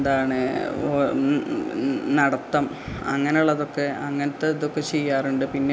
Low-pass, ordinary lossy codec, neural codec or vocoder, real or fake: none; none; none; real